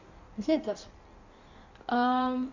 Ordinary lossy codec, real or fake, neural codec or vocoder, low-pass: none; fake; codec, 16 kHz in and 24 kHz out, 1.1 kbps, FireRedTTS-2 codec; 7.2 kHz